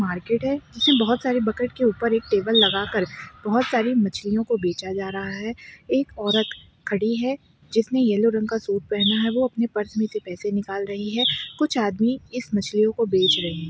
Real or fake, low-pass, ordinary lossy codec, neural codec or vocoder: real; none; none; none